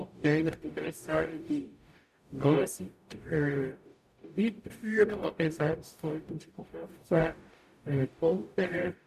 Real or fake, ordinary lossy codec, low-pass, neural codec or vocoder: fake; none; 14.4 kHz; codec, 44.1 kHz, 0.9 kbps, DAC